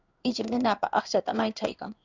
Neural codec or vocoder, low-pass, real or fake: codec, 16 kHz in and 24 kHz out, 1 kbps, XY-Tokenizer; 7.2 kHz; fake